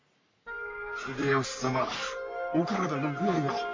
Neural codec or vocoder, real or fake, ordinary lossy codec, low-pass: codec, 44.1 kHz, 3.4 kbps, Pupu-Codec; fake; MP3, 48 kbps; 7.2 kHz